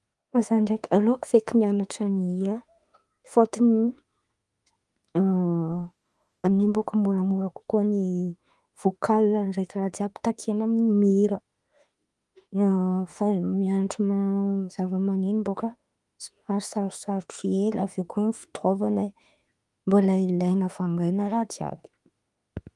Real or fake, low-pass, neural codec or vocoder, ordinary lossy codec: fake; 10.8 kHz; autoencoder, 48 kHz, 32 numbers a frame, DAC-VAE, trained on Japanese speech; Opus, 32 kbps